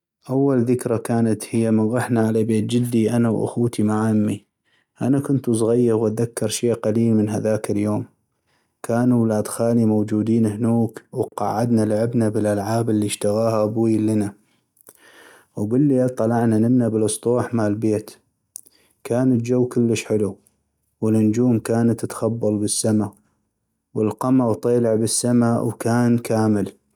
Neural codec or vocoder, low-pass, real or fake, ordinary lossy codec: none; 19.8 kHz; real; none